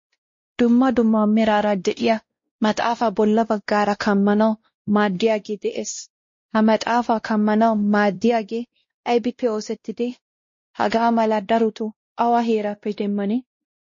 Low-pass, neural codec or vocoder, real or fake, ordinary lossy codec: 7.2 kHz; codec, 16 kHz, 1 kbps, X-Codec, WavLM features, trained on Multilingual LibriSpeech; fake; MP3, 32 kbps